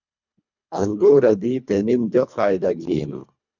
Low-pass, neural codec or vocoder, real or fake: 7.2 kHz; codec, 24 kHz, 1.5 kbps, HILCodec; fake